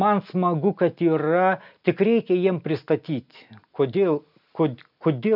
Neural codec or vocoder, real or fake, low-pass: none; real; 5.4 kHz